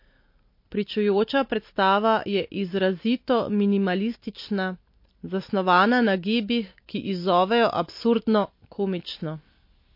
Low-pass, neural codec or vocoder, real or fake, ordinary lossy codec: 5.4 kHz; none; real; MP3, 32 kbps